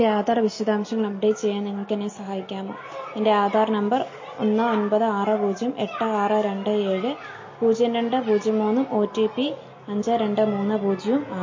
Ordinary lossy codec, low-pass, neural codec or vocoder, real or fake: MP3, 32 kbps; 7.2 kHz; none; real